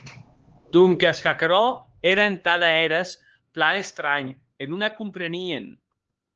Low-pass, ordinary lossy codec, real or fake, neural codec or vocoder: 7.2 kHz; Opus, 16 kbps; fake; codec, 16 kHz, 2 kbps, X-Codec, HuBERT features, trained on LibriSpeech